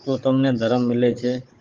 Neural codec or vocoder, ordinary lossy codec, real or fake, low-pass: codec, 16 kHz, 16 kbps, FunCodec, trained on Chinese and English, 50 frames a second; Opus, 24 kbps; fake; 7.2 kHz